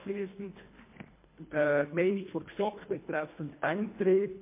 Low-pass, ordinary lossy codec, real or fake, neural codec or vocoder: 3.6 kHz; MP3, 24 kbps; fake; codec, 24 kHz, 1.5 kbps, HILCodec